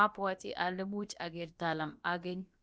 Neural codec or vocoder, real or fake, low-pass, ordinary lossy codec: codec, 16 kHz, about 1 kbps, DyCAST, with the encoder's durations; fake; none; none